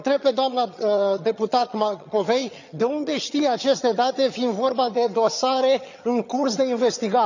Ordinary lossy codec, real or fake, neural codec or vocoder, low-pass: none; fake; vocoder, 22.05 kHz, 80 mel bands, HiFi-GAN; 7.2 kHz